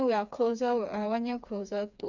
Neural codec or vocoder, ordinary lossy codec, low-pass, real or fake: codec, 16 kHz, 4 kbps, FreqCodec, smaller model; none; 7.2 kHz; fake